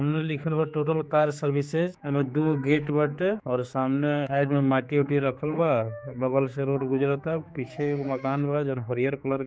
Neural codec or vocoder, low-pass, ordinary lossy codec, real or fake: codec, 16 kHz, 4 kbps, X-Codec, HuBERT features, trained on general audio; none; none; fake